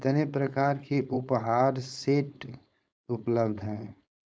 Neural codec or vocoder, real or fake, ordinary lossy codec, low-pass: codec, 16 kHz, 4.8 kbps, FACodec; fake; none; none